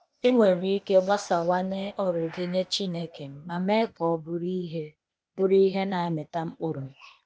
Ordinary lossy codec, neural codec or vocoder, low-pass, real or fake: none; codec, 16 kHz, 0.8 kbps, ZipCodec; none; fake